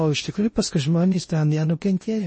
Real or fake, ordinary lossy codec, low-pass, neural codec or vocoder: fake; MP3, 32 kbps; 9.9 kHz; codec, 16 kHz in and 24 kHz out, 0.6 kbps, FocalCodec, streaming, 2048 codes